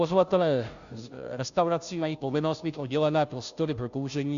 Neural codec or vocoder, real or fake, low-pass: codec, 16 kHz, 0.5 kbps, FunCodec, trained on Chinese and English, 25 frames a second; fake; 7.2 kHz